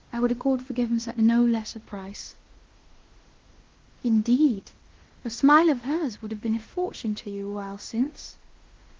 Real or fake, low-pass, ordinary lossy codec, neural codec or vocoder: fake; 7.2 kHz; Opus, 16 kbps; codec, 16 kHz in and 24 kHz out, 0.9 kbps, LongCat-Audio-Codec, fine tuned four codebook decoder